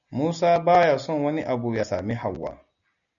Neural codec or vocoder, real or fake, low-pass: none; real; 7.2 kHz